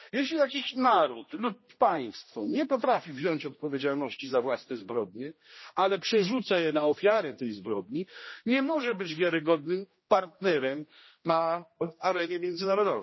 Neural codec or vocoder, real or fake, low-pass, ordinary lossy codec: codec, 16 kHz, 1 kbps, X-Codec, HuBERT features, trained on general audio; fake; 7.2 kHz; MP3, 24 kbps